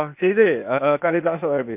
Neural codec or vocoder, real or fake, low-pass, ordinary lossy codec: codec, 16 kHz, 0.8 kbps, ZipCodec; fake; 3.6 kHz; MP3, 32 kbps